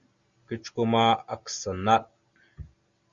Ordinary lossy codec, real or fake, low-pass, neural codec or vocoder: Opus, 64 kbps; real; 7.2 kHz; none